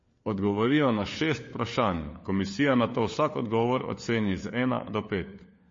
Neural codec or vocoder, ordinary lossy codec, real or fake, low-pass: codec, 16 kHz, 4 kbps, FunCodec, trained on LibriTTS, 50 frames a second; MP3, 32 kbps; fake; 7.2 kHz